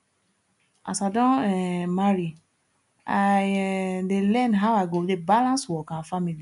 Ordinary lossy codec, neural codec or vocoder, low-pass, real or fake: none; none; 10.8 kHz; real